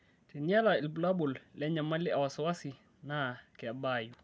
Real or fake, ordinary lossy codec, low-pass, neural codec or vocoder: real; none; none; none